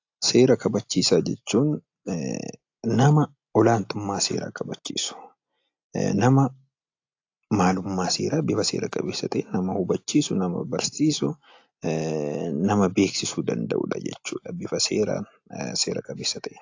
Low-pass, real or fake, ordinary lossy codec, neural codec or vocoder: 7.2 kHz; real; AAC, 48 kbps; none